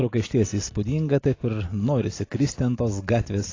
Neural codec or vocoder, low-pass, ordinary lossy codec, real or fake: none; 7.2 kHz; AAC, 32 kbps; real